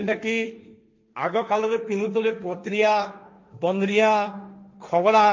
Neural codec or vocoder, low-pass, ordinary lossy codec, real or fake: codec, 16 kHz, 1.1 kbps, Voila-Tokenizer; 7.2 kHz; MP3, 48 kbps; fake